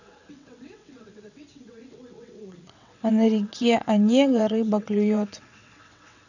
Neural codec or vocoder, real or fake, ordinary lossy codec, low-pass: vocoder, 22.05 kHz, 80 mel bands, WaveNeXt; fake; none; 7.2 kHz